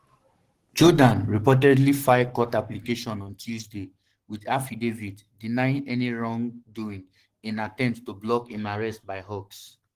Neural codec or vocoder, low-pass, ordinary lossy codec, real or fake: codec, 44.1 kHz, 7.8 kbps, Pupu-Codec; 14.4 kHz; Opus, 16 kbps; fake